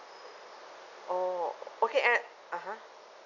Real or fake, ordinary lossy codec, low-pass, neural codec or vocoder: real; none; 7.2 kHz; none